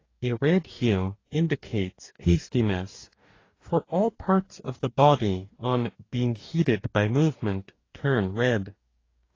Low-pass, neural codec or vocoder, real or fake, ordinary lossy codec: 7.2 kHz; codec, 44.1 kHz, 2.6 kbps, DAC; fake; AAC, 32 kbps